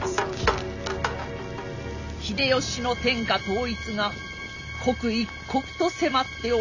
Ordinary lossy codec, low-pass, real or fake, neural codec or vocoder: none; 7.2 kHz; real; none